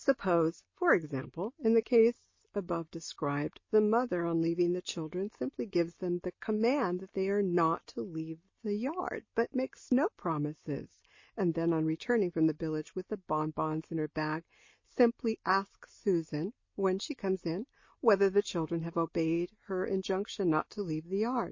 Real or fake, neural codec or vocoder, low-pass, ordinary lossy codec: real; none; 7.2 kHz; MP3, 32 kbps